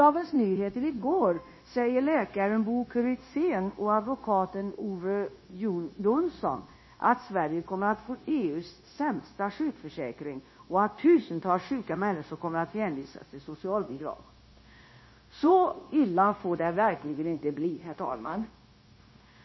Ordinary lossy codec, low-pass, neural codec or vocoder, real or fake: MP3, 24 kbps; 7.2 kHz; codec, 16 kHz, 0.9 kbps, LongCat-Audio-Codec; fake